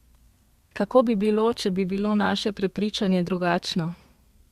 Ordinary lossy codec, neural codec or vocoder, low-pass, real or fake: Opus, 64 kbps; codec, 32 kHz, 1.9 kbps, SNAC; 14.4 kHz; fake